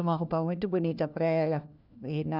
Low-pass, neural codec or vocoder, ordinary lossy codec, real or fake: 5.4 kHz; codec, 16 kHz, 1 kbps, FunCodec, trained on LibriTTS, 50 frames a second; none; fake